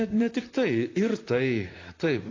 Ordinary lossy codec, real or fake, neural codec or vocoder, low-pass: AAC, 32 kbps; fake; vocoder, 44.1 kHz, 128 mel bands, Pupu-Vocoder; 7.2 kHz